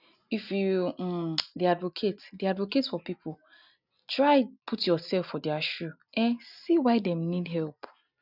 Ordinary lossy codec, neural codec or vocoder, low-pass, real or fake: none; none; 5.4 kHz; real